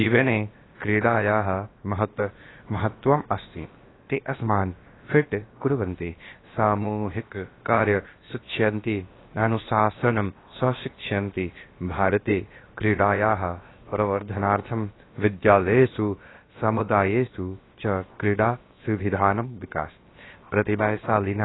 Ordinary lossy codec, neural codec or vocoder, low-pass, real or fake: AAC, 16 kbps; codec, 16 kHz, about 1 kbps, DyCAST, with the encoder's durations; 7.2 kHz; fake